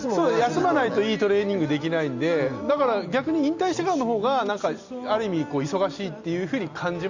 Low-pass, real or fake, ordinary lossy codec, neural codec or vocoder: 7.2 kHz; real; Opus, 64 kbps; none